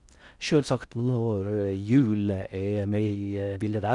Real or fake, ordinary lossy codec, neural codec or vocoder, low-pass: fake; none; codec, 16 kHz in and 24 kHz out, 0.6 kbps, FocalCodec, streaming, 4096 codes; 10.8 kHz